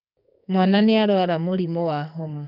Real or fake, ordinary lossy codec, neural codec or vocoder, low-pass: fake; none; codec, 44.1 kHz, 2.6 kbps, SNAC; 5.4 kHz